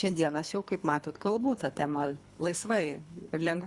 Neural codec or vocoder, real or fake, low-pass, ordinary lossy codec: codec, 24 kHz, 3 kbps, HILCodec; fake; 10.8 kHz; Opus, 64 kbps